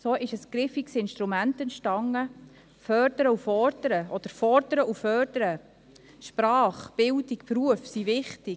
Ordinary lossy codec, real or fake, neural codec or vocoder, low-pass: none; real; none; none